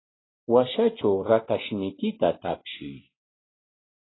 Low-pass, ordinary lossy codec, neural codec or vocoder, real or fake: 7.2 kHz; AAC, 16 kbps; none; real